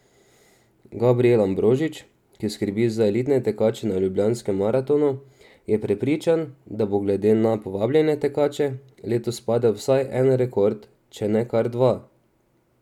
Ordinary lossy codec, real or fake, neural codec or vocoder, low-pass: none; real; none; 19.8 kHz